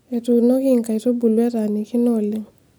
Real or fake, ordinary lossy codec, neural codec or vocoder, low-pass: real; none; none; none